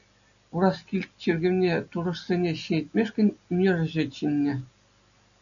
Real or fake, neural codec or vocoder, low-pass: real; none; 7.2 kHz